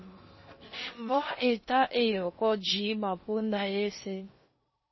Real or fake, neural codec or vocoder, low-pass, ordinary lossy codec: fake; codec, 16 kHz in and 24 kHz out, 0.6 kbps, FocalCodec, streaming, 4096 codes; 7.2 kHz; MP3, 24 kbps